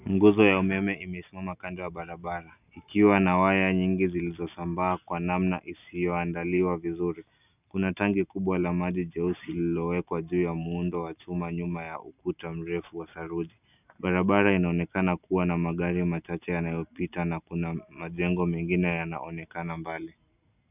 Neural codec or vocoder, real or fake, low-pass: none; real; 3.6 kHz